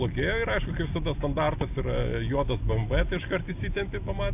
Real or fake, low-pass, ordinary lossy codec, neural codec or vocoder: real; 3.6 kHz; AAC, 32 kbps; none